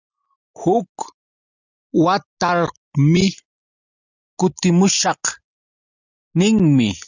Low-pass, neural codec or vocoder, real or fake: 7.2 kHz; none; real